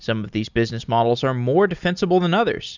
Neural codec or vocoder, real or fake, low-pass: none; real; 7.2 kHz